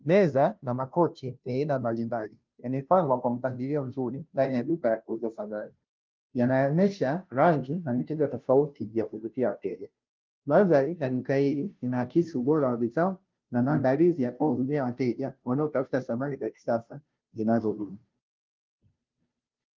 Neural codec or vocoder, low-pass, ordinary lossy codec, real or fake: codec, 16 kHz, 0.5 kbps, FunCodec, trained on Chinese and English, 25 frames a second; 7.2 kHz; Opus, 24 kbps; fake